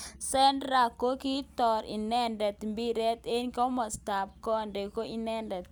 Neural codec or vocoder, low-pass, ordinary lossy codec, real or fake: none; none; none; real